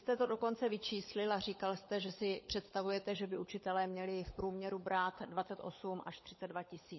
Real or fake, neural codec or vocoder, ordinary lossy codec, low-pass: fake; codec, 16 kHz, 16 kbps, FunCodec, trained on LibriTTS, 50 frames a second; MP3, 24 kbps; 7.2 kHz